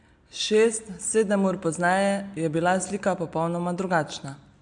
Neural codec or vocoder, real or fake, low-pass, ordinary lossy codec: none; real; 9.9 kHz; MP3, 64 kbps